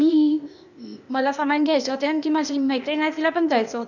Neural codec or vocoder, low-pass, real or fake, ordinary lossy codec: codec, 16 kHz, 0.8 kbps, ZipCodec; 7.2 kHz; fake; none